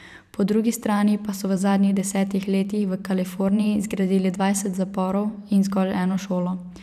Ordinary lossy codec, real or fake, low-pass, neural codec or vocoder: none; fake; 14.4 kHz; vocoder, 44.1 kHz, 128 mel bands every 512 samples, BigVGAN v2